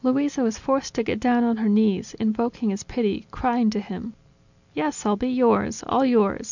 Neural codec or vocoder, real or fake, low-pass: none; real; 7.2 kHz